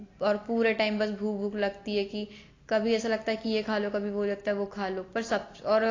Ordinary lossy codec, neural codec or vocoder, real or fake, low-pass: AAC, 32 kbps; none; real; 7.2 kHz